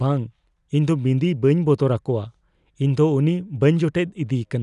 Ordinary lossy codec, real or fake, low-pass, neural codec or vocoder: none; real; 10.8 kHz; none